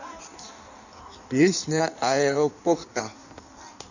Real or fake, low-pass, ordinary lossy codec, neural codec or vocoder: fake; 7.2 kHz; none; codec, 16 kHz in and 24 kHz out, 1.1 kbps, FireRedTTS-2 codec